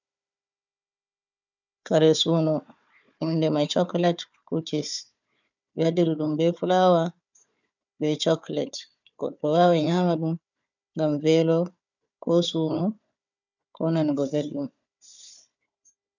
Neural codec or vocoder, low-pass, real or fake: codec, 16 kHz, 4 kbps, FunCodec, trained on Chinese and English, 50 frames a second; 7.2 kHz; fake